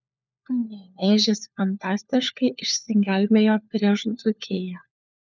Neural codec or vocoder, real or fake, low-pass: codec, 16 kHz, 4 kbps, FunCodec, trained on LibriTTS, 50 frames a second; fake; 7.2 kHz